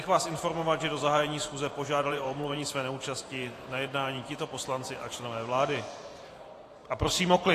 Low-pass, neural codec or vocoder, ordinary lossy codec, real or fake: 14.4 kHz; vocoder, 44.1 kHz, 128 mel bands every 512 samples, BigVGAN v2; AAC, 48 kbps; fake